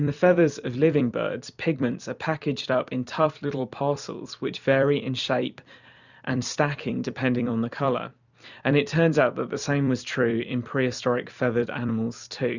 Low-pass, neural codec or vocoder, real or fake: 7.2 kHz; vocoder, 44.1 kHz, 128 mel bands every 256 samples, BigVGAN v2; fake